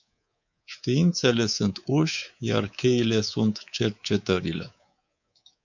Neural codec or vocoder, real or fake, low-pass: codec, 24 kHz, 3.1 kbps, DualCodec; fake; 7.2 kHz